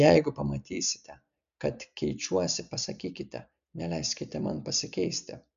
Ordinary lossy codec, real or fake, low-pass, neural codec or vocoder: MP3, 96 kbps; real; 7.2 kHz; none